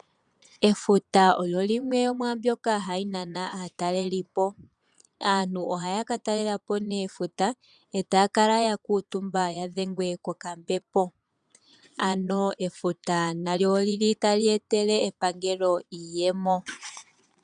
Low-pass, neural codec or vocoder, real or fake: 9.9 kHz; vocoder, 22.05 kHz, 80 mel bands, Vocos; fake